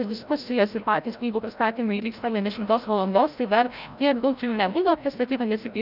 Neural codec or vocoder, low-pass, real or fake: codec, 16 kHz, 0.5 kbps, FreqCodec, larger model; 5.4 kHz; fake